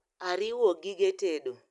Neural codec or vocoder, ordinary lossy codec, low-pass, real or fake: none; none; 10.8 kHz; real